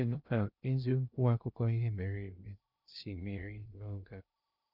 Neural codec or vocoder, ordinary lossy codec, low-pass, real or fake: codec, 16 kHz in and 24 kHz out, 0.6 kbps, FocalCodec, streaming, 2048 codes; none; 5.4 kHz; fake